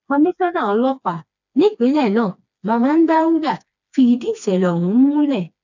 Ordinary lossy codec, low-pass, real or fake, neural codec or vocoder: none; 7.2 kHz; fake; codec, 16 kHz, 2 kbps, FreqCodec, smaller model